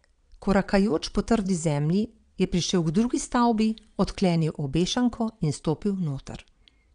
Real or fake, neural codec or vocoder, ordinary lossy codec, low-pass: fake; vocoder, 22.05 kHz, 80 mel bands, Vocos; none; 9.9 kHz